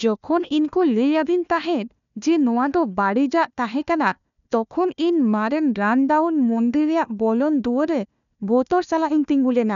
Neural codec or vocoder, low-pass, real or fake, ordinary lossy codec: codec, 16 kHz, 1 kbps, FunCodec, trained on Chinese and English, 50 frames a second; 7.2 kHz; fake; none